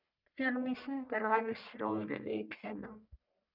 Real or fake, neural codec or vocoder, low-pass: fake; codec, 44.1 kHz, 1.7 kbps, Pupu-Codec; 5.4 kHz